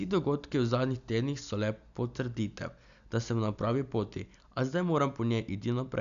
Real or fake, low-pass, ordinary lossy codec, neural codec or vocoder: real; 7.2 kHz; none; none